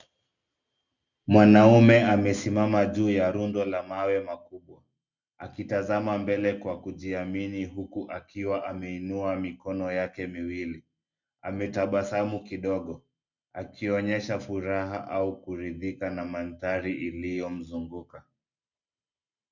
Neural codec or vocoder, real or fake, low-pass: none; real; 7.2 kHz